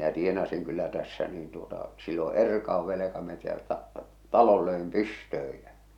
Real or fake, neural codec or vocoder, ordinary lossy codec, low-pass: real; none; none; 19.8 kHz